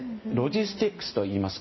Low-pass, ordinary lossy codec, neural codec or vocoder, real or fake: 7.2 kHz; MP3, 24 kbps; vocoder, 44.1 kHz, 128 mel bands every 512 samples, BigVGAN v2; fake